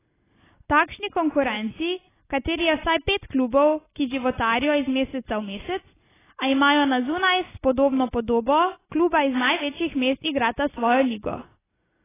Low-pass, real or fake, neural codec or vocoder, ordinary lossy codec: 3.6 kHz; real; none; AAC, 16 kbps